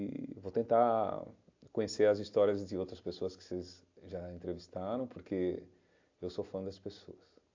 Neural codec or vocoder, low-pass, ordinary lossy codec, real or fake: none; 7.2 kHz; AAC, 48 kbps; real